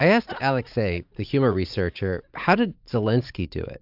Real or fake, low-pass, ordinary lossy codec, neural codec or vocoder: fake; 5.4 kHz; AAC, 48 kbps; vocoder, 44.1 kHz, 80 mel bands, Vocos